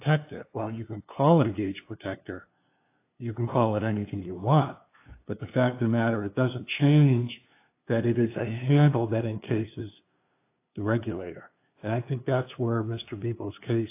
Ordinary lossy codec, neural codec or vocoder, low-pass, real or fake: AAC, 24 kbps; codec, 16 kHz, 2 kbps, FunCodec, trained on LibriTTS, 25 frames a second; 3.6 kHz; fake